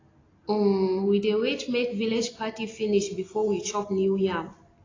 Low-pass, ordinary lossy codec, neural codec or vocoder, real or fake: 7.2 kHz; AAC, 32 kbps; none; real